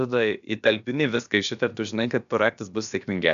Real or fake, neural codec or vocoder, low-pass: fake; codec, 16 kHz, about 1 kbps, DyCAST, with the encoder's durations; 7.2 kHz